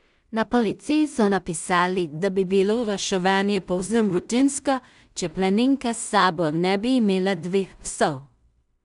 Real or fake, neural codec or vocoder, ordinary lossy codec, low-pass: fake; codec, 16 kHz in and 24 kHz out, 0.4 kbps, LongCat-Audio-Codec, two codebook decoder; none; 10.8 kHz